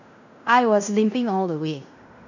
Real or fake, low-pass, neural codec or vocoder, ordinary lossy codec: fake; 7.2 kHz; codec, 16 kHz in and 24 kHz out, 0.9 kbps, LongCat-Audio-Codec, fine tuned four codebook decoder; none